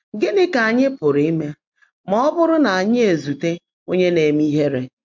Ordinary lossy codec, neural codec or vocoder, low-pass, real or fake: MP3, 48 kbps; none; 7.2 kHz; real